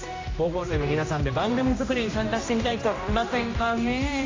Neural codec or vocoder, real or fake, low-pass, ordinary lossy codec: codec, 16 kHz, 1 kbps, X-Codec, HuBERT features, trained on general audio; fake; 7.2 kHz; AAC, 32 kbps